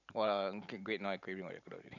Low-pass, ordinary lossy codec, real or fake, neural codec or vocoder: 7.2 kHz; none; fake; vocoder, 44.1 kHz, 128 mel bands every 512 samples, BigVGAN v2